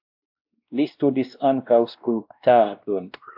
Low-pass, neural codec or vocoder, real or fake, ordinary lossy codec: 5.4 kHz; codec, 16 kHz, 1 kbps, X-Codec, WavLM features, trained on Multilingual LibriSpeech; fake; AAC, 48 kbps